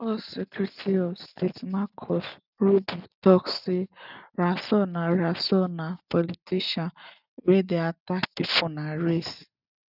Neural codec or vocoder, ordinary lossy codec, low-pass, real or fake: none; none; 5.4 kHz; real